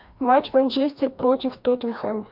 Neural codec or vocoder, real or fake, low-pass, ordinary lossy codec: codec, 16 kHz, 1 kbps, FreqCodec, larger model; fake; 5.4 kHz; MP3, 48 kbps